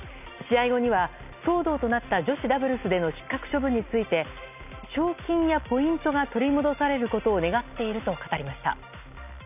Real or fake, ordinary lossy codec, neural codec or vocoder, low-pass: real; none; none; 3.6 kHz